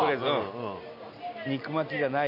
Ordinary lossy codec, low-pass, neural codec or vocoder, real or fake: none; 5.4 kHz; none; real